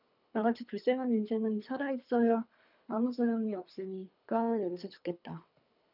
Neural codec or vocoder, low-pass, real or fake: codec, 24 kHz, 3 kbps, HILCodec; 5.4 kHz; fake